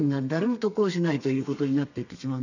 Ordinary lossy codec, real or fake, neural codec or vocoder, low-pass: none; fake; codec, 32 kHz, 1.9 kbps, SNAC; 7.2 kHz